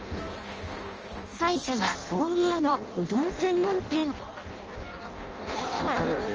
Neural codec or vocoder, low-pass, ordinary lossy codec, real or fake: codec, 16 kHz in and 24 kHz out, 0.6 kbps, FireRedTTS-2 codec; 7.2 kHz; Opus, 24 kbps; fake